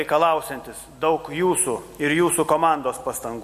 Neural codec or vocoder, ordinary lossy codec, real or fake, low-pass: none; MP3, 64 kbps; real; 14.4 kHz